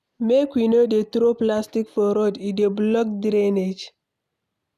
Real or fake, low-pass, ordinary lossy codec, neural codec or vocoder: real; 14.4 kHz; none; none